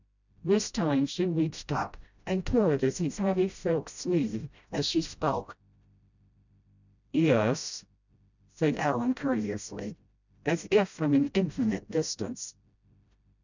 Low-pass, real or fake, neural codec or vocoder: 7.2 kHz; fake; codec, 16 kHz, 0.5 kbps, FreqCodec, smaller model